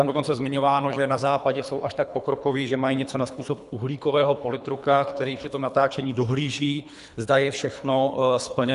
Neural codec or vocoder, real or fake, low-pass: codec, 24 kHz, 3 kbps, HILCodec; fake; 10.8 kHz